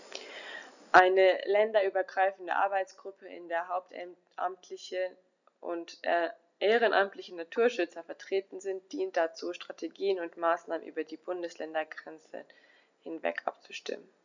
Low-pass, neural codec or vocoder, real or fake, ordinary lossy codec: 7.2 kHz; none; real; none